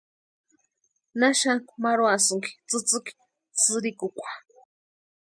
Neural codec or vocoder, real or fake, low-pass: none; real; 9.9 kHz